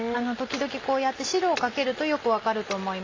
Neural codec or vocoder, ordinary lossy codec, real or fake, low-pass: none; AAC, 32 kbps; real; 7.2 kHz